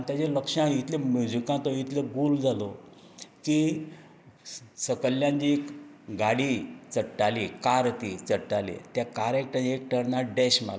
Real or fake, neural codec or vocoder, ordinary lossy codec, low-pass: real; none; none; none